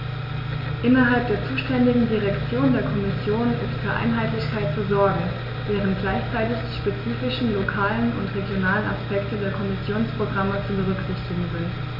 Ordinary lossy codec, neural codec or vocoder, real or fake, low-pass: MP3, 48 kbps; none; real; 5.4 kHz